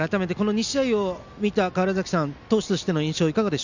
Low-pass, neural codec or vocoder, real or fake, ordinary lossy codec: 7.2 kHz; none; real; none